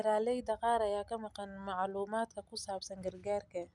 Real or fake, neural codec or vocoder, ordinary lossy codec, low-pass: real; none; none; 10.8 kHz